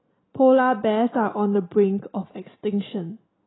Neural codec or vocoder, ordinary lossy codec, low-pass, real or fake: none; AAC, 16 kbps; 7.2 kHz; real